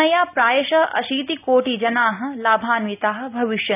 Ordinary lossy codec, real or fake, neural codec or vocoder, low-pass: none; real; none; 3.6 kHz